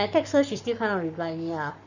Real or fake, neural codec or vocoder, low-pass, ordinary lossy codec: fake; codec, 44.1 kHz, 7.8 kbps, Pupu-Codec; 7.2 kHz; none